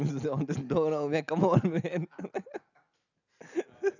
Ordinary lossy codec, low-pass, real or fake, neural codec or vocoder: none; 7.2 kHz; real; none